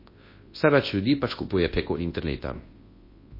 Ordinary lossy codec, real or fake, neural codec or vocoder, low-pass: MP3, 24 kbps; fake; codec, 24 kHz, 0.9 kbps, WavTokenizer, large speech release; 5.4 kHz